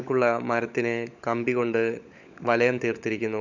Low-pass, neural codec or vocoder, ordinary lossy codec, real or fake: 7.2 kHz; codec, 16 kHz, 16 kbps, FunCodec, trained on LibriTTS, 50 frames a second; none; fake